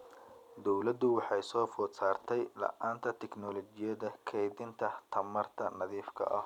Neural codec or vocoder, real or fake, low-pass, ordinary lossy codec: none; real; 19.8 kHz; none